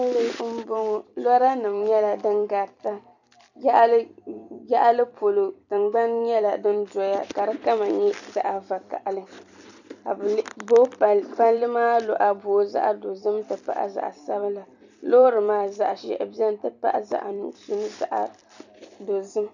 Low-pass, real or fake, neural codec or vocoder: 7.2 kHz; fake; vocoder, 44.1 kHz, 128 mel bands every 256 samples, BigVGAN v2